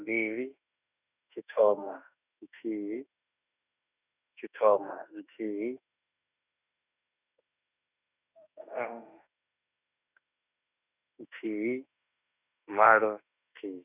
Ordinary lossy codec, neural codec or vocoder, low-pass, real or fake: none; autoencoder, 48 kHz, 32 numbers a frame, DAC-VAE, trained on Japanese speech; 3.6 kHz; fake